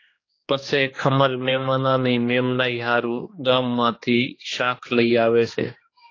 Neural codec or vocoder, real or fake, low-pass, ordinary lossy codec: codec, 16 kHz, 2 kbps, X-Codec, HuBERT features, trained on general audio; fake; 7.2 kHz; AAC, 32 kbps